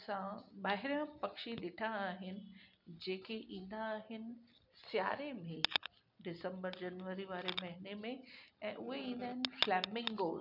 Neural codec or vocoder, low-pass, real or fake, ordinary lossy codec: none; 5.4 kHz; real; none